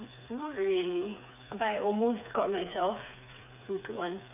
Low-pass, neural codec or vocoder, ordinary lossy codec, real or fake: 3.6 kHz; codec, 16 kHz, 4 kbps, FreqCodec, smaller model; none; fake